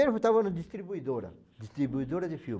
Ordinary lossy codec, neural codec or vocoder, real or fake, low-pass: none; none; real; none